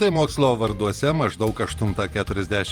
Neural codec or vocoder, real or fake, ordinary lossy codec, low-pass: vocoder, 48 kHz, 128 mel bands, Vocos; fake; Opus, 32 kbps; 19.8 kHz